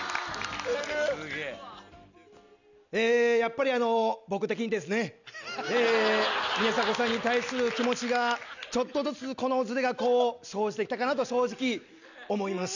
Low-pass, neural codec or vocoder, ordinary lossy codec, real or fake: 7.2 kHz; none; none; real